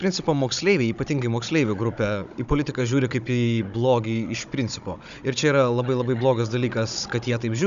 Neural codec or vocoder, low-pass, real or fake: codec, 16 kHz, 16 kbps, FunCodec, trained on Chinese and English, 50 frames a second; 7.2 kHz; fake